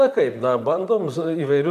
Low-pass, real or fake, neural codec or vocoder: 14.4 kHz; fake; vocoder, 44.1 kHz, 128 mel bands, Pupu-Vocoder